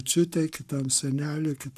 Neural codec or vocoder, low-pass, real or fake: codec, 44.1 kHz, 7.8 kbps, Pupu-Codec; 14.4 kHz; fake